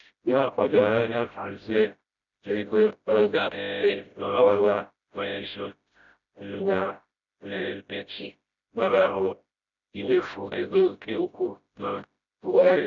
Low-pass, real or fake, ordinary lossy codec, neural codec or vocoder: 7.2 kHz; fake; none; codec, 16 kHz, 0.5 kbps, FreqCodec, smaller model